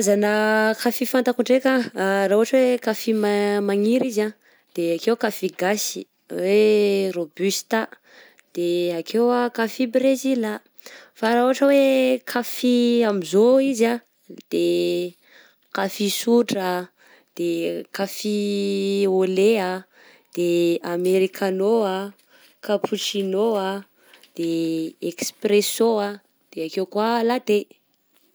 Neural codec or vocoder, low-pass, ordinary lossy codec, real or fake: none; none; none; real